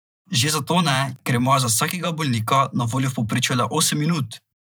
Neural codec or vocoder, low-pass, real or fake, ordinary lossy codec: vocoder, 44.1 kHz, 128 mel bands every 512 samples, BigVGAN v2; none; fake; none